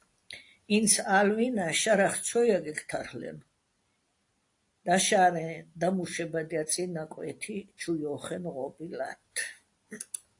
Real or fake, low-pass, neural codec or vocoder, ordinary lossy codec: real; 10.8 kHz; none; AAC, 48 kbps